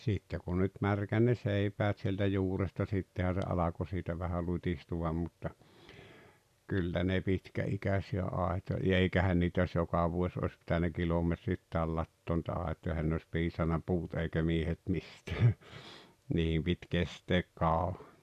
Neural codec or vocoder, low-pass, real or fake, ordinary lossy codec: none; 14.4 kHz; real; none